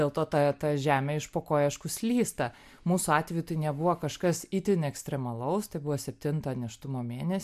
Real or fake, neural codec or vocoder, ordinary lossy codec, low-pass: real; none; MP3, 96 kbps; 14.4 kHz